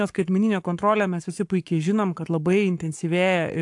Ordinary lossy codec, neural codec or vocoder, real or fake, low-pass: AAC, 64 kbps; codec, 44.1 kHz, 7.8 kbps, Pupu-Codec; fake; 10.8 kHz